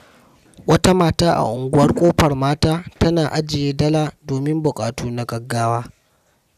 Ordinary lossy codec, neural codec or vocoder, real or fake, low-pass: none; none; real; 14.4 kHz